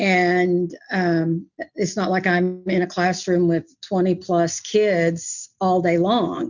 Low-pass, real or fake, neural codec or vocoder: 7.2 kHz; real; none